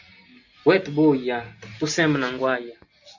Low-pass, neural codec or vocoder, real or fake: 7.2 kHz; none; real